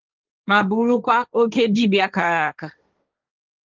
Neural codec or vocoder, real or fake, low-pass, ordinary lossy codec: codec, 16 kHz, 1.1 kbps, Voila-Tokenizer; fake; 7.2 kHz; Opus, 32 kbps